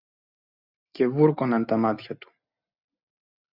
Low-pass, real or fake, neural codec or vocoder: 5.4 kHz; real; none